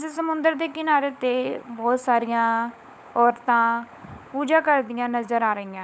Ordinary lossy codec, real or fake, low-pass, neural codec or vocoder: none; fake; none; codec, 16 kHz, 16 kbps, FunCodec, trained on LibriTTS, 50 frames a second